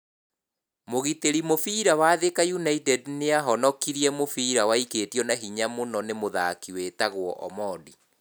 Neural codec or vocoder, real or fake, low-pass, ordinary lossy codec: none; real; none; none